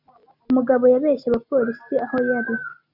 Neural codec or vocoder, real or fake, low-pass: none; real; 5.4 kHz